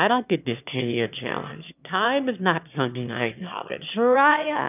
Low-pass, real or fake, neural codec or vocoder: 3.6 kHz; fake; autoencoder, 22.05 kHz, a latent of 192 numbers a frame, VITS, trained on one speaker